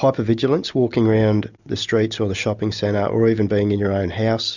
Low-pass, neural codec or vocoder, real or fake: 7.2 kHz; none; real